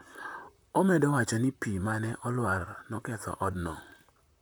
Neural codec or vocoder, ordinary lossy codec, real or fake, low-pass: vocoder, 44.1 kHz, 128 mel bands, Pupu-Vocoder; none; fake; none